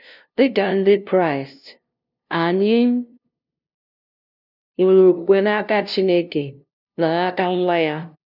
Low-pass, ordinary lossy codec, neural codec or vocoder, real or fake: 5.4 kHz; none; codec, 16 kHz, 0.5 kbps, FunCodec, trained on LibriTTS, 25 frames a second; fake